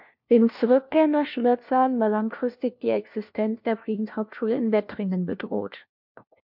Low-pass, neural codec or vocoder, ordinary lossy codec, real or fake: 5.4 kHz; codec, 16 kHz, 1 kbps, FunCodec, trained on LibriTTS, 50 frames a second; MP3, 48 kbps; fake